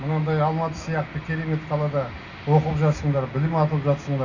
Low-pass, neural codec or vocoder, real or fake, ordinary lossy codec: 7.2 kHz; none; real; none